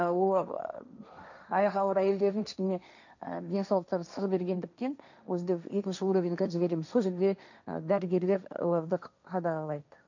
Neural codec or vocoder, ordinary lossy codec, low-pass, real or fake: codec, 16 kHz, 1.1 kbps, Voila-Tokenizer; none; 7.2 kHz; fake